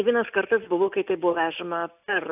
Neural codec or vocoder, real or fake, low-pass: none; real; 3.6 kHz